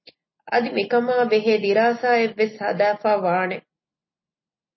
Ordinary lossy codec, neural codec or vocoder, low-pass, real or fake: MP3, 24 kbps; none; 7.2 kHz; real